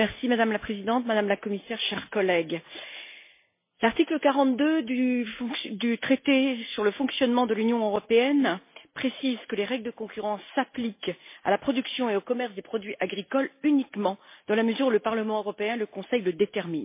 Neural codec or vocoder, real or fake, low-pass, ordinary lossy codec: none; real; 3.6 kHz; MP3, 24 kbps